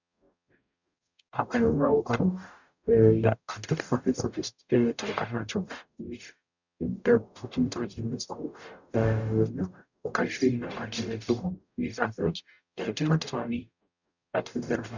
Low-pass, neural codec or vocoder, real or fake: 7.2 kHz; codec, 44.1 kHz, 0.9 kbps, DAC; fake